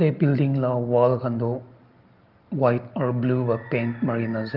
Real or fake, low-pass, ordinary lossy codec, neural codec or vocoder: real; 5.4 kHz; Opus, 32 kbps; none